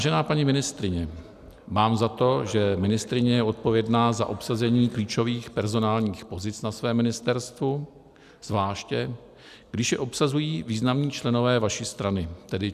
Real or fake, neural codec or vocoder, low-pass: real; none; 14.4 kHz